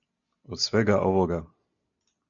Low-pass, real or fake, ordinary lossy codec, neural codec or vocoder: 7.2 kHz; real; MP3, 64 kbps; none